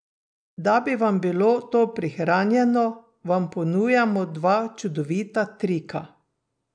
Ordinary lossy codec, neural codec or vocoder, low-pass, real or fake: none; none; 9.9 kHz; real